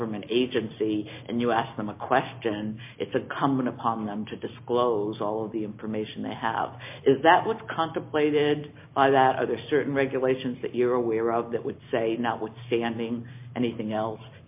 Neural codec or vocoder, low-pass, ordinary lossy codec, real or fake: none; 3.6 kHz; MP3, 32 kbps; real